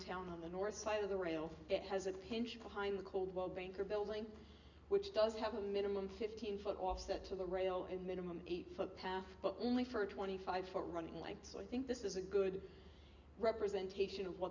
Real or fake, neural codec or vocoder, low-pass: real; none; 7.2 kHz